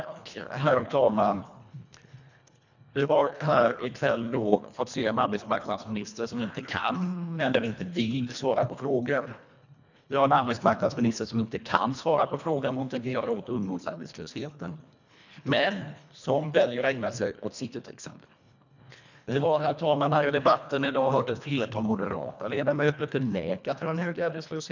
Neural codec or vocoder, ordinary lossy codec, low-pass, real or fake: codec, 24 kHz, 1.5 kbps, HILCodec; none; 7.2 kHz; fake